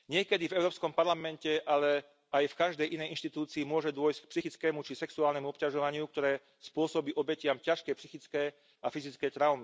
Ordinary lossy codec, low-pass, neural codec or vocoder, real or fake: none; none; none; real